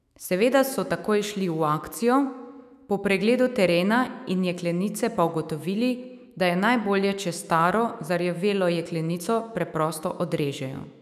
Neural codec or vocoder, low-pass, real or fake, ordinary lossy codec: autoencoder, 48 kHz, 128 numbers a frame, DAC-VAE, trained on Japanese speech; 14.4 kHz; fake; none